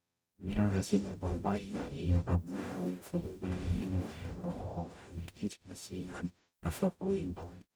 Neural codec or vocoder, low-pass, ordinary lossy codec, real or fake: codec, 44.1 kHz, 0.9 kbps, DAC; none; none; fake